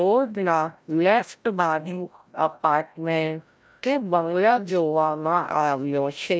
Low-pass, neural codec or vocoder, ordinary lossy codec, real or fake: none; codec, 16 kHz, 0.5 kbps, FreqCodec, larger model; none; fake